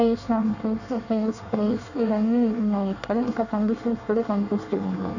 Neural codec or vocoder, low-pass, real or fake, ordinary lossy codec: codec, 24 kHz, 1 kbps, SNAC; 7.2 kHz; fake; none